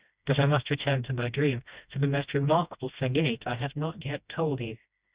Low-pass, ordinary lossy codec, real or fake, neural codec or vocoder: 3.6 kHz; Opus, 64 kbps; fake; codec, 16 kHz, 1 kbps, FreqCodec, smaller model